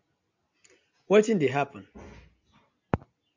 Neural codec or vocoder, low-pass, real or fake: none; 7.2 kHz; real